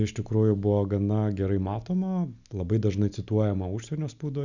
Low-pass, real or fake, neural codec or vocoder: 7.2 kHz; real; none